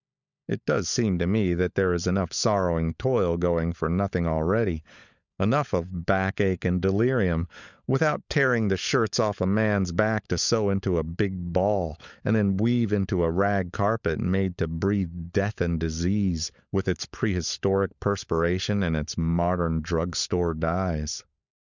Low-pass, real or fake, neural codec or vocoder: 7.2 kHz; fake; codec, 16 kHz, 16 kbps, FunCodec, trained on LibriTTS, 50 frames a second